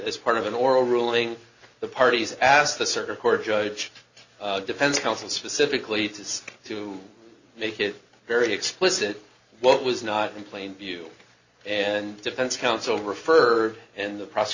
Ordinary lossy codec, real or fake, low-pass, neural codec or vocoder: Opus, 64 kbps; real; 7.2 kHz; none